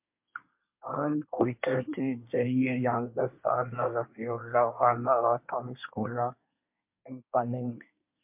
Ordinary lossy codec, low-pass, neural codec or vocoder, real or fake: MP3, 32 kbps; 3.6 kHz; codec, 24 kHz, 1 kbps, SNAC; fake